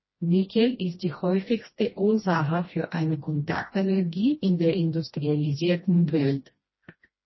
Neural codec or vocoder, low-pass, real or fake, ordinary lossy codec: codec, 16 kHz, 1 kbps, FreqCodec, smaller model; 7.2 kHz; fake; MP3, 24 kbps